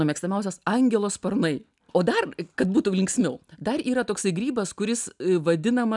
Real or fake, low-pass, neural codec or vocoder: real; 10.8 kHz; none